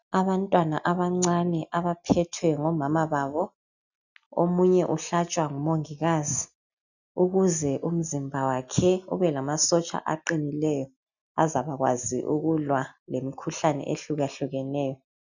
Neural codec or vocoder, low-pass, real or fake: none; 7.2 kHz; real